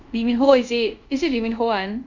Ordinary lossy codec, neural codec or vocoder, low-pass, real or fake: none; codec, 24 kHz, 0.5 kbps, DualCodec; 7.2 kHz; fake